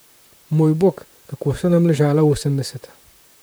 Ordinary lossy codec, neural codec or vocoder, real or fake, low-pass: none; vocoder, 44.1 kHz, 128 mel bands, Pupu-Vocoder; fake; none